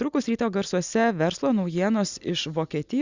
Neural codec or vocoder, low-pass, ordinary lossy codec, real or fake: none; 7.2 kHz; Opus, 64 kbps; real